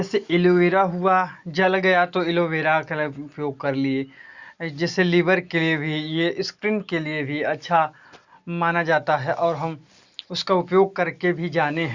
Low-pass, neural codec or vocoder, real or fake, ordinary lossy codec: 7.2 kHz; none; real; Opus, 64 kbps